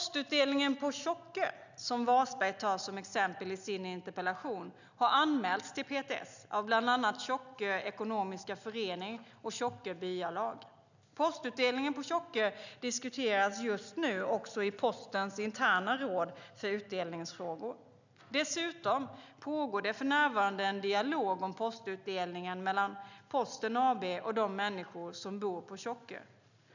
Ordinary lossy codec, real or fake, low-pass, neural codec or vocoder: none; real; 7.2 kHz; none